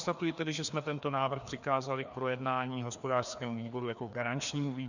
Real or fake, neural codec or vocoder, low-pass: fake; codec, 16 kHz, 2 kbps, FreqCodec, larger model; 7.2 kHz